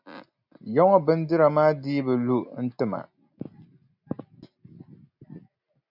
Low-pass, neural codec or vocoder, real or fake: 5.4 kHz; none; real